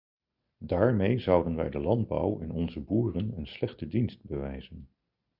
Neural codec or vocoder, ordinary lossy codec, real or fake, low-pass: none; Opus, 64 kbps; real; 5.4 kHz